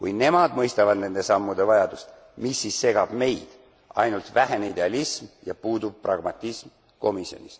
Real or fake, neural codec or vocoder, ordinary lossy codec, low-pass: real; none; none; none